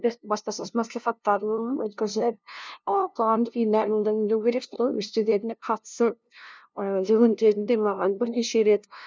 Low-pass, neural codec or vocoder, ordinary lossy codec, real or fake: none; codec, 16 kHz, 0.5 kbps, FunCodec, trained on LibriTTS, 25 frames a second; none; fake